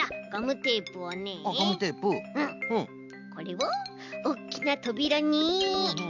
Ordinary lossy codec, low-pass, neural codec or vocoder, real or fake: none; 7.2 kHz; none; real